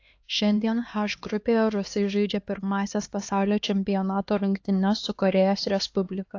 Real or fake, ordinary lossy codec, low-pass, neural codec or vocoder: fake; AAC, 48 kbps; 7.2 kHz; codec, 16 kHz, 2 kbps, X-Codec, HuBERT features, trained on LibriSpeech